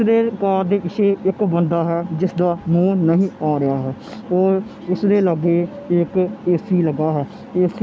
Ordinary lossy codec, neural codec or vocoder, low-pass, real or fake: none; none; none; real